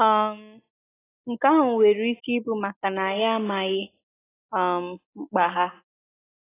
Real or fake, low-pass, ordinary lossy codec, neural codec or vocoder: real; 3.6 kHz; AAC, 16 kbps; none